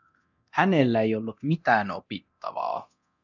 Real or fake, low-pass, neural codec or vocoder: fake; 7.2 kHz; codec, 24 kHz, 0.9 kbps, DualCodec